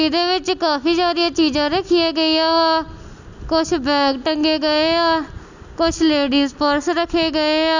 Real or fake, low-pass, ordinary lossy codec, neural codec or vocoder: real; 7.2 kHz; none; none